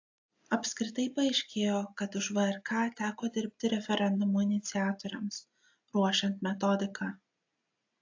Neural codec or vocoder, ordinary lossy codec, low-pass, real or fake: none; AAC, 48 kbps; 7.2 kHz; real